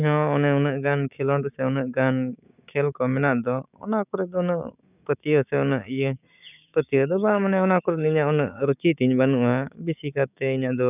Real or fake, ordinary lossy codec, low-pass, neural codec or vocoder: fake; none; 3.6 kHz; codec, 16 kHz, 6 kbps, DAC